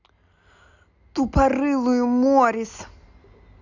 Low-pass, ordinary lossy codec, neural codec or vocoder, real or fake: 7.2 kHz; none; none; real